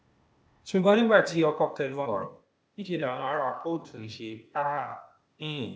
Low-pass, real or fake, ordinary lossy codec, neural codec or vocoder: none; fake; none; codec, 16 kHz, 0.8 kbps, ZipCodec